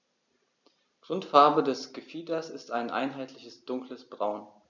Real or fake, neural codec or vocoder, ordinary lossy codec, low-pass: real; none; none; 7.2 kHz